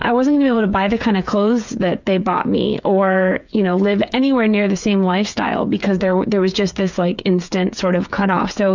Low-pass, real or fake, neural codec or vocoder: 7.2 kHz; fake; codec, 16 kHz, 8 kbps, FreqCodec, smaller model